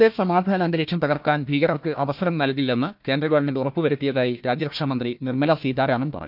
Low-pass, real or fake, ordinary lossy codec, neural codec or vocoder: 5.4 kHz; fake; none; codec, 16 kHz, 1 kbps, FunCodec, trained on Chinese and English, 50 frames a second